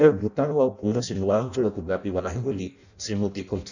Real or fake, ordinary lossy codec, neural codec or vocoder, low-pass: fake; none; codec, 16 kHz in and 24 kHz out, 0.6 kbps, FireRedTTS-2 codec; 7.2 kHz